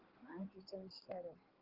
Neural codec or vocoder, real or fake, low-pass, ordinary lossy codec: codec, 16 kHz in and 24 kHz out, 2.2 kbps, FireRedTTS-2 codec; fake; 5.4 kHz; Opus, 16 kbps